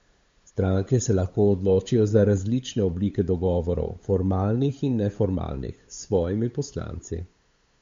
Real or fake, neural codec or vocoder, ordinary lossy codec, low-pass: fake; codec, 16 kHz, 8 kbps, FunCodec, trained on LibriTTS, 25 frames a second; MP3, 48 kbps; 7.2 kHz